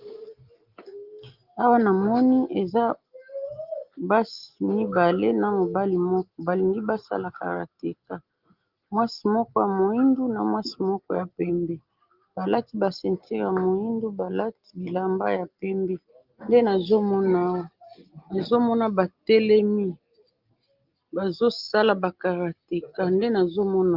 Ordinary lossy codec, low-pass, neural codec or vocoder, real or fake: Opus, 32 kbps; 5.4 kHz; none; real